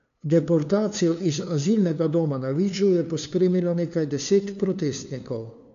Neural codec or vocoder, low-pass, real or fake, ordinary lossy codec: codec, 16 kHz, 2 kbps, FunCodec, trained on LibriTTS, 25 frames a second; 7.2 kHz; fake; none